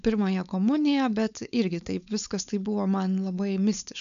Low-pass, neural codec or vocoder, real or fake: 7.2 kHz; codec, 16 kHz, 4.8 kbps, FACodec; fake